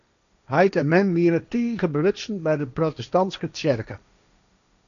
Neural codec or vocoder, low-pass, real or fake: codec, 16 kHz, 1.1 kbps, Voila-Tokenizer; 7.2 kHz; fake